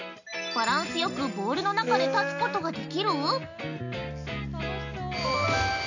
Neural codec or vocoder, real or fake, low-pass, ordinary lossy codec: none; real; 7.2 kHz; none